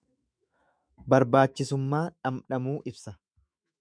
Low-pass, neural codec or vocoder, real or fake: 9.9 kHz; autoencoder, 48 kHz, 128 numbers a frame, DAC-VAE, trained on Japanese speech; fake